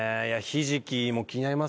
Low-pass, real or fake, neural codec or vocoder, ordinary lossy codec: none; real; none; none